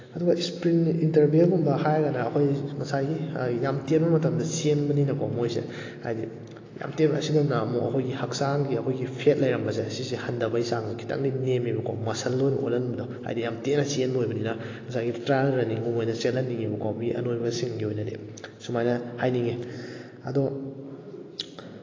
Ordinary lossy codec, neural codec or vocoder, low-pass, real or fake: AAC, 32 kbps; none; 7.2 kHz; real